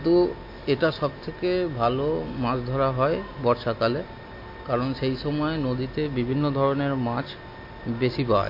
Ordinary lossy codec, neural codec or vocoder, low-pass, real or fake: MP3, 32 kbps; none; 5.4 kHz; real